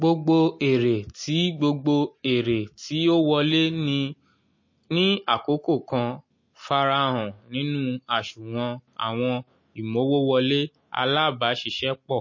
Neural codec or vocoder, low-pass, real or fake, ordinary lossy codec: none; 7.2 kHz; real; MP3, 32 kbps